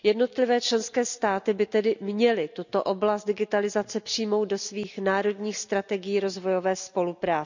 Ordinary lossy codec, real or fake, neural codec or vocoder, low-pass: none; real; none; 7.2 kHz